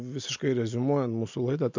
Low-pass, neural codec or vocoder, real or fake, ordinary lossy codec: 7.2 kHz; none; real; MP3, 64 kbps